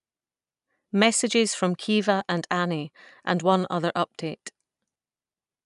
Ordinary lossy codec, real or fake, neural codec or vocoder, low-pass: none; real; none; 10.8 kHz